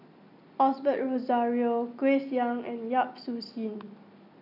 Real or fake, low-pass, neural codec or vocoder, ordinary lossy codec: real; 5.4 kHz; none; none